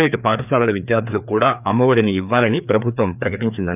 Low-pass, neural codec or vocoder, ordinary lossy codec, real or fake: 3.6 kHz; codec, 16 kHz, 2 kbps, FreqCodec, larger model; none; fake